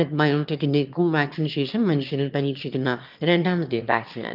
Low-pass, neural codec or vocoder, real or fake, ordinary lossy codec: 5.4 kHz; autoencoder, 22.05 kHz, a latent of 192 numbers a frame, VITS, trained on one speaker; fake; Opus, 24 kbps